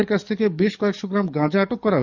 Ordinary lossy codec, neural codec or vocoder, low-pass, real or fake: none; codec, 44.1 kHz, 7.8 kbps, DAC; 7.2 kHz; fake